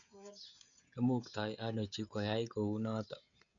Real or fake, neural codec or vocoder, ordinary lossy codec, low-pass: fake; codec, 16 kHz, 16 kbps, FreqCodec, smaller model; none; 7.2 kHz